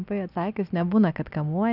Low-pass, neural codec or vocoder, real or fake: 5.4 kHz; none; real